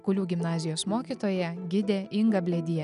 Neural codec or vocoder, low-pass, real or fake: none; 10.8 kHz; real